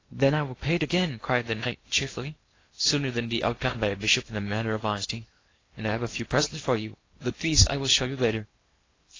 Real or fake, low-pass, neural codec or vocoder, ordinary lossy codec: fake; 7.2 kHz; codec, 16 kHz in and 24 kHz out, 0.8 kbps, FocalCodec, streaming, 65536 codes; AAC, 32 kbps